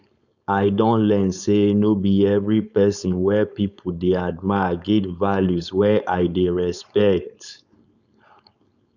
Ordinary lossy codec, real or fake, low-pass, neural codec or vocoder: none; fake; 7.2 kHz; codec, 16 kHz, 4.8 kbps, FACodec